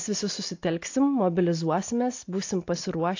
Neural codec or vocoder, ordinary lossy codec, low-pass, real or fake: none; AAC, 48 kbps; 7.2 kHz; real